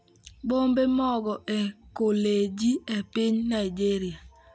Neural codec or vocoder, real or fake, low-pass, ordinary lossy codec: none; real; none; none